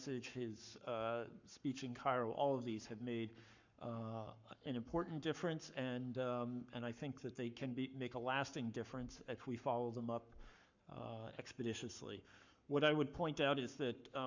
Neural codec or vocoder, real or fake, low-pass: codec, 44.1 kHz, 7.8 kbps, Pupu-Codec; fake; 7.2 kHz